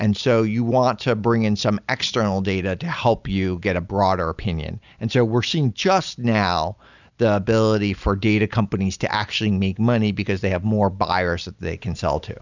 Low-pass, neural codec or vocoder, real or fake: 7.2 kHz; none; real